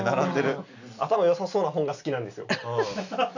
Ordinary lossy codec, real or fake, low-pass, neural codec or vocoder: none; real; 7.2 kHz; none